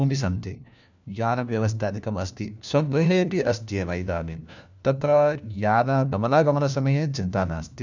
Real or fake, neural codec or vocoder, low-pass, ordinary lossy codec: fake; codec, 16 kHz, 1 kbps, FunCodec, trained on LibriTTS, 50 frames a second; 7.2 kHz; none